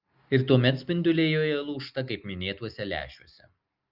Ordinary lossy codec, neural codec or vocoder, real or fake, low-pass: Opus, 24 kbps; none; real; 5.4 kHz